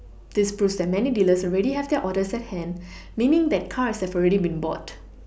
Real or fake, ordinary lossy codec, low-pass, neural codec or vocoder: real; none; none; none